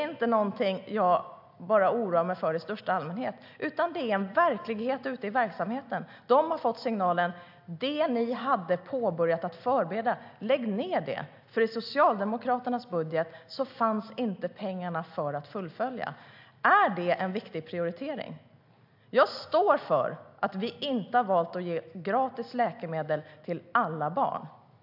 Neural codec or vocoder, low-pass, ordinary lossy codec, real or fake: none; 5.4 kHz; AAC, 48 kbps; real